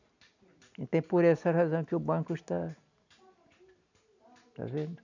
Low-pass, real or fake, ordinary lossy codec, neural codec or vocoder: 7.2 kHz; real; none; none